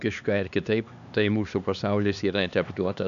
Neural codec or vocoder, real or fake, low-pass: codec, 16 kHz, 2 kbps, X-Codec, HuBERT features, trained on LibriSpeech; fake; 7.2 kHz